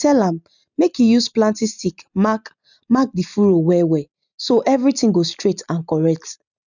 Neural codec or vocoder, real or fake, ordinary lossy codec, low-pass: none; real; none; 7.2 kHz